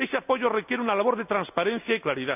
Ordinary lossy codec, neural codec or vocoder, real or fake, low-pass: none; none; real; 3.6 kHz